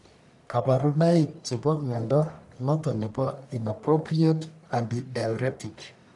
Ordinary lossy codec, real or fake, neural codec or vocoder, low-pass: none; fake; codec, 44.1 kHz, 1.7 kbps, Pupu-Codec; 10.8 kHz